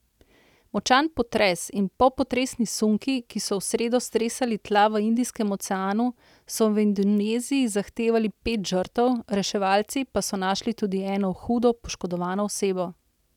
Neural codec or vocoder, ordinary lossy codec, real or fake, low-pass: none; none; real; 19.8 kHz